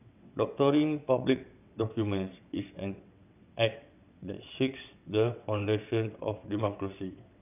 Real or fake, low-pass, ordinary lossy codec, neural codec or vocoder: fake; 3.6 kHz; none; vocoder, 22.05 kHz, 80 mel bands, WaveNeXt